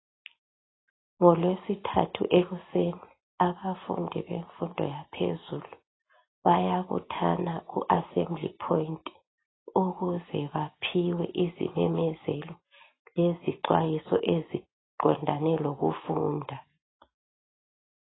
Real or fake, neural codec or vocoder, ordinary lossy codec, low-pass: real; none; AAC, 16 kbps; 7.2 kHz